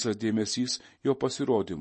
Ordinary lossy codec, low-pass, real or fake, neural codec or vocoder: MP3, 32 kbps; 10.8 kHz; real; none